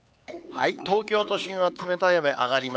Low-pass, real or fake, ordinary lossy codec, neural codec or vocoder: none; fake; none; codec, 16 kHz, 4 kbps, X-Codec, HuBERT features, trained on LibriSpeech